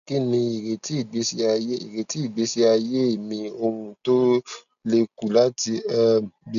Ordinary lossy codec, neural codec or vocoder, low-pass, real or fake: none; none; 7.2 kHz; real